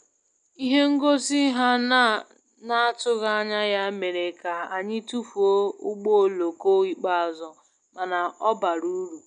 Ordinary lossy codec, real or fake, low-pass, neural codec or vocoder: none; real; 9.9 kHz; none